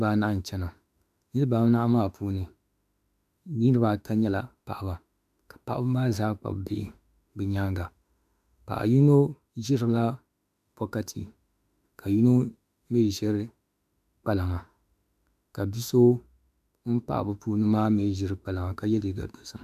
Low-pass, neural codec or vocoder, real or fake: 14.4 kHz; autoencoder, 48 kHz, 32 numbers a frame, DAC-VAE, trained on Japanese speech; fake